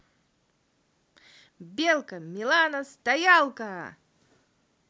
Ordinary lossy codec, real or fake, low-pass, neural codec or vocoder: none; real; none; none